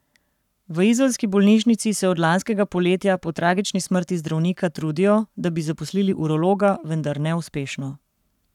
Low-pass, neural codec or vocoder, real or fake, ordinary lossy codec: 19.8 kHz; codec, 44.1 kHz, 7.8 kbps, Pupu-Codec; fake; none